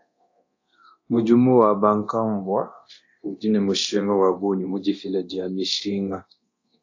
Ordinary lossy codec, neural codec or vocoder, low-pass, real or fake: AAC, 48 kbps; codec, 24 kHz, 0.9 kbps, DualCodec; 7.2 kHz; fake